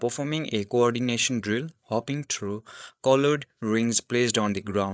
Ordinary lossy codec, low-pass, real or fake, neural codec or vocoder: none; none; fake; codec, 16 kHz, 8 kbps, FreqCodec, larger model